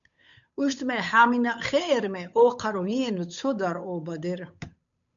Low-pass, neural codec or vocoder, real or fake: 7.2 kHz; codec, 16 kHz, 8 kbps, FunCodec, trained on Chinese and English, 25 frames a second; fake